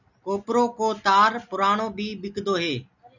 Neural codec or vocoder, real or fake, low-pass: none; real; 7.2 kHz